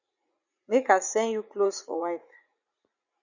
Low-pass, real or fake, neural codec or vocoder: 7.2 kHz; fake; vocoder, 44.1 kHz, 80 mel bands, Vocos